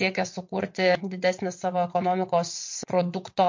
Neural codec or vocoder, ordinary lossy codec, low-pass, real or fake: vocoder, 22.05 kHz, 80 mel bands, WaveNeXt; MP3, 48 kbps; 7.2 kHz; fake